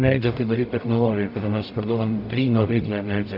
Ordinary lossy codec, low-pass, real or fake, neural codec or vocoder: AAC, 48 kbps; 5.4 kHz; fake; codec, 44.1 kHz, 0.9 kbps, DAC